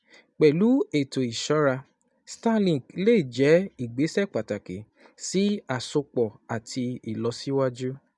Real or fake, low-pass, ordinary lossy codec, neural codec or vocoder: real; 10.8 kHz; none; none